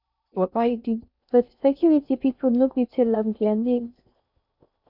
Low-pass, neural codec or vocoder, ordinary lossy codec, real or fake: 5.4 kHz; codec, 16 kHz in and 24 kHz out, 0.6 kbps, FocalCodec, streaming, 2048 codes; none; fake